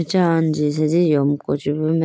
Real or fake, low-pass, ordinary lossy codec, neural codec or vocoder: real; none; none; none